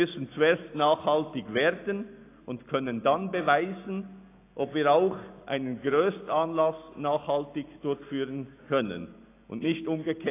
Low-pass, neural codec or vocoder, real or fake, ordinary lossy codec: 3.6 kHz; none; real; AAC, 24 kbps